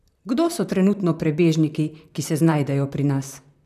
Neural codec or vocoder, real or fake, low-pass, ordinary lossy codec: vocoder, 44.1 kHz, 128 mel bands, Pupu-Vocoder; fake; 14.4 kHz; none